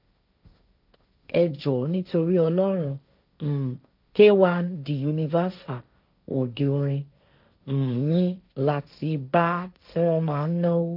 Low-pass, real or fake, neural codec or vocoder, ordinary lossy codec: 5.4 kHz; fake; codec, 16 kHz, 1.1 kbps, Voila-Tokenizer; none